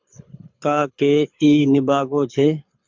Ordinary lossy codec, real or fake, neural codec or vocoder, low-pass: MP3, 64 kbps; fake; codec, 24 kHz, 6 kbps, HILCodec; 7.2 kHz